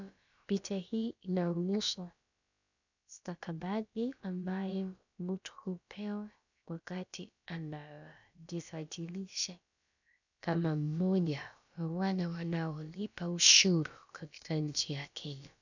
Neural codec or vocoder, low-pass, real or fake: codec, 16 kHz, about 1 kbps, DyCAST, with the encoder's durations; 7.2 kHz; fake